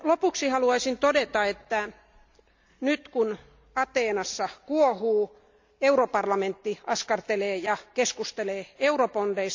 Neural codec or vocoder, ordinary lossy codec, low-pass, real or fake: none; MP3, 64 kbps; 7.2 kHz; real